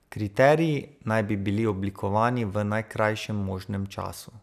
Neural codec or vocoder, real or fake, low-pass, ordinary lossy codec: none; real; 14.4 kHz; none